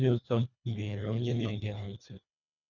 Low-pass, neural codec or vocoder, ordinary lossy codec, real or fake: 7.2 kHz; codec, 24 kHz, 1.5 kbps, HILCodec; none; fake